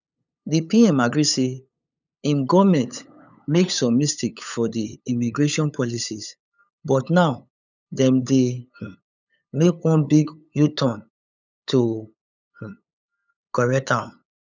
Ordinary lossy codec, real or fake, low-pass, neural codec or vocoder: none; fake; 7.2 kHz; codec, 16 kHz, 8 kbps, FunCodec, trained on LibriTTS, 25 frames a second